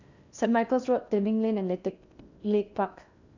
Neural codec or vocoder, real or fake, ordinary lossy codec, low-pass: codec, 16 kHz in and 24 kHz out, 0.8 kbps, FocalCodec, streaming, 65536 codes; fake; none; 7.2 kHz